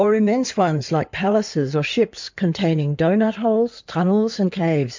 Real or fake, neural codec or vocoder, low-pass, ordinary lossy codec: fake; codec, 16 kHz in and 24 kHz out, 2.2 kbps, FireRedTTS-2 codec; 7.2 kHz; MP3, 64 kbps